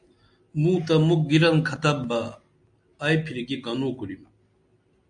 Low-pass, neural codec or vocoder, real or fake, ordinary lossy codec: 9.9 kHz; none; real; AAC, 64 kbps